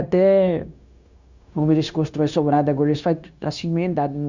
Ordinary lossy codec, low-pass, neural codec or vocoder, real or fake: Opus, 64 kbps; 7.2 kHz; codec, 16 kHz, 0.9 kbps, LongCat-Audio-Codec; fake